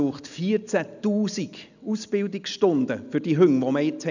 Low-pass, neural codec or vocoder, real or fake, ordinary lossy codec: 7.2 kHz; none; real; none